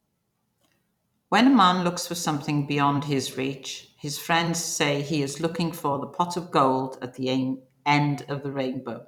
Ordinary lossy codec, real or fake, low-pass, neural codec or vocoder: none; fake; 19.8 kHz; vocoder, 44.1 kHz, 128 mel bands every 256 samples, BigVGAN v2